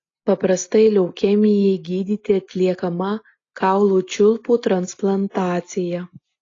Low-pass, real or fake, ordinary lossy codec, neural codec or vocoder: 7.2 kHz; real; AAC, 32 kbps; none